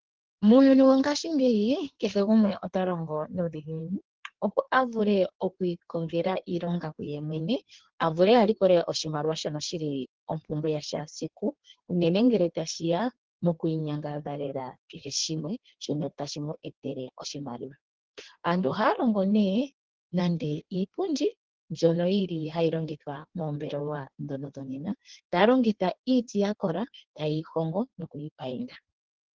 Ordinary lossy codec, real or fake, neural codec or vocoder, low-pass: Opus, 16 kbps; fake; codec, 16 kHz in and 24 kHz out, 1.1 kbps, FireRedTTS-2 codec; 7.2 kHz